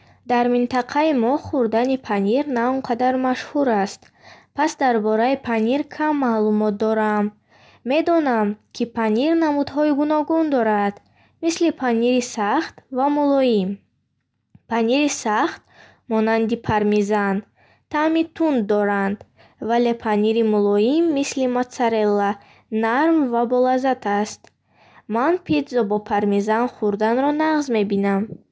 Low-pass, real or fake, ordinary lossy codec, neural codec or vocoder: none; real; none; none